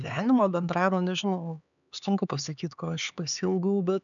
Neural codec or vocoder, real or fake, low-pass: codec, 16 kHz, 4 kbps, X-Codec, HuBERT features, trained on LibriSpeech; fake; 7.2 kHz